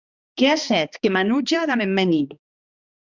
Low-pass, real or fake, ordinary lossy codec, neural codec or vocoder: 7.2 kHz; fake; Opus, 64 kbps; codec, 16 kHz, 2 kbps, X-Codec, HuBERT features, trained on balanced general audio